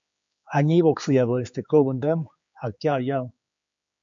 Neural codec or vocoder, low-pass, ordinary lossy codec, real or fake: codec, 16 kHz, 4 kbps, X-Codec, HuBERT features, trained on general audio; 7.2 kHz; MP3, 48 kbps; fake